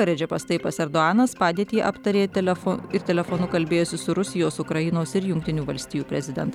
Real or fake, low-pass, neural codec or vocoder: real; 19.8 kHz; none